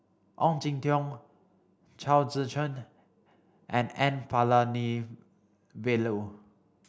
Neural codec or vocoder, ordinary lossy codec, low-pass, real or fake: none; none; none; real